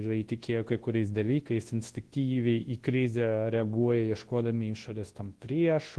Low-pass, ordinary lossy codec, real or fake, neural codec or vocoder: 10.8 kHz; Opus, 16 kbps; fake; codec, 24 kHz, 0.9 kbps, WavTokenizer, large speech release